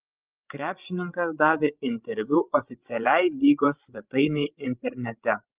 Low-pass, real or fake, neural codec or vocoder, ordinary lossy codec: 3.6 kHz; fake; codec, 16 kHz, 16 kbps, FreqCodec, larger model; Opus, 32 kbps